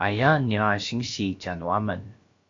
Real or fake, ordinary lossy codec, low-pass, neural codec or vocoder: fake; AAC, 48 kbps; 7.2 kHz; codec, 16 kHz, about 1 kbps, DyCAST, with the encoder's durations